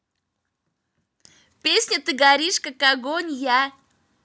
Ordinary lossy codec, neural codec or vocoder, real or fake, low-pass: none; none; real; none